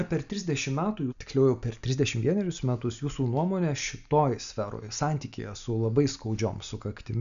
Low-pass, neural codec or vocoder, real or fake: 7.2 kHz; none; real